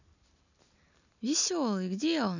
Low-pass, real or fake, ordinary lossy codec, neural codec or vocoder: 7.2 kHz; real; none; none